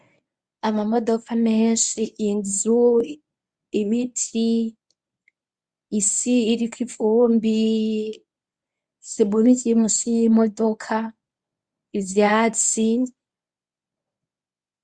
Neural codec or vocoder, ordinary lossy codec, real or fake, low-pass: codec, 24 kHz, 0.9 kbps, WavTokenizer, medium speech release version 1; Opus, 64 kbps; fake; 9.9 kHz